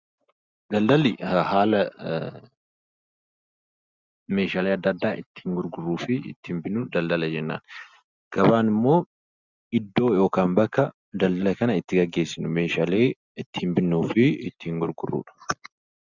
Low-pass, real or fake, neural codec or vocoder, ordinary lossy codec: 7.2 kHz; real; none; Opus, 64 kbps